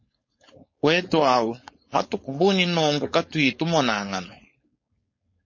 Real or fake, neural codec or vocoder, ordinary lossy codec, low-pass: fake; codec, 16 kHz, 4.8 kbps, FACodec; MP3, 32 kbps; 7.2 kHz